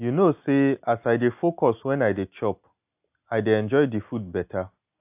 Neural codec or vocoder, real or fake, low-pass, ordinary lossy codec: none; real; 3.6 kHz; AAC, 32 kbps